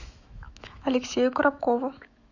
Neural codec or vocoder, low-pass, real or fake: autoencoder, 48 kHz, 128 numbers a frame, DAC-VAE, trained on Japanese speech; 7.2 kHz; fake